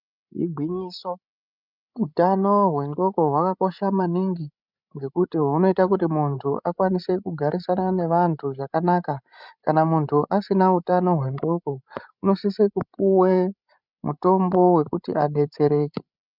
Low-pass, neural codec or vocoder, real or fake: 5.4 kHz; codec, 16 kHz, 16 kbps, FreqCodec, larger model; fake